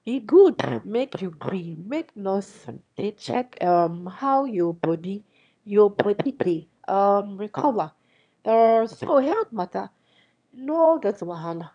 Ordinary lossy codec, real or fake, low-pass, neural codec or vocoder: none; fake; 9.9 kHz; autoencoder, 22.05 kHz, a latent of 192 numbers a frame, VITS, trained on one speaker